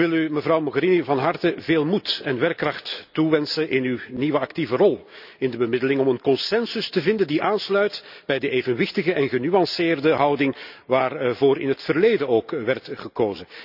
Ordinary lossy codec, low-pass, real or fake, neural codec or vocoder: none; 5.4 kHz; real; none